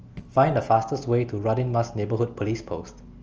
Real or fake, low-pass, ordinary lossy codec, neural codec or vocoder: real; 7.2 kHz; Opus, 24 kbps; none